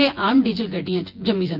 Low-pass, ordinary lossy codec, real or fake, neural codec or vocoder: 5.4 kHz; Opus, 24 kbps; fake; vocoder, 24 kHz, 100 mel bands, Vocos